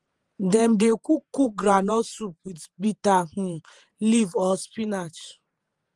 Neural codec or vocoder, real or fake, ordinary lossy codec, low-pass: vocoder, 48 kHz, 128 mel bands, Vocos; fake; Opus, 24 kbps; 10.8 kHz